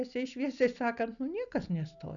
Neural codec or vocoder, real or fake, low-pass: none; real; 7.2 kHz